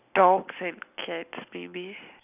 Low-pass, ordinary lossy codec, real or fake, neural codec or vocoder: 3.6 kHz; none; real; none